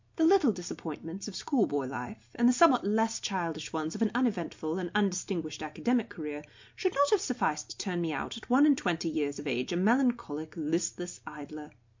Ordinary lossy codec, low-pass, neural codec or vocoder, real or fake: MP3, 48 kbps; 7.2 kHz; none; real